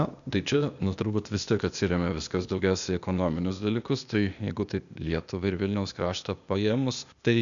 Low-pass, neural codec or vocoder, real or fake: 7.2 kHz; codec, 16 kHz, 0.8 kbps, ZipCodec; fake